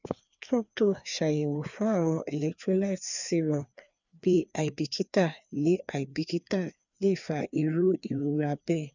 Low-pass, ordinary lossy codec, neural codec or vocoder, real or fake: 7.2 kHz; none; codec, 16 kHz, 2 kbps, FreqCodec, larger model; fake